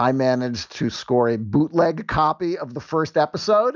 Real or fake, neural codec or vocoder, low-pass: real; none; 7.2 kHz